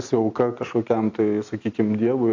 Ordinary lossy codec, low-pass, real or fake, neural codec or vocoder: AAC, 48 kbps; 7.2 kHz; real; none